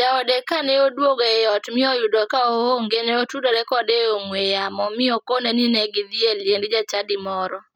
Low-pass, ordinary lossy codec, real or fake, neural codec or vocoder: 19.8 kHz; none; real; none